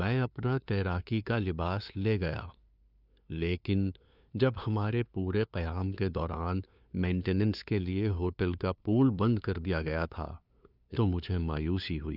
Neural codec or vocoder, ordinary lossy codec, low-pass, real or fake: codec, 16 kHz, 2 kbps, FunCodec, trained on LibriTTS, 25 frames a second; none; 5.4 kHz; fake